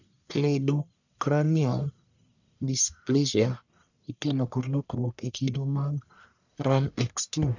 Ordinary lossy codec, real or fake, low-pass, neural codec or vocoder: none; fake; 7.2 kHz; codec, 44.1 kHz, 1.7 kbps, Pupu-Codec